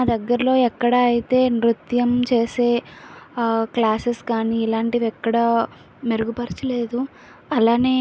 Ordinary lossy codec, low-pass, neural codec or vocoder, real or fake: none; none; none; real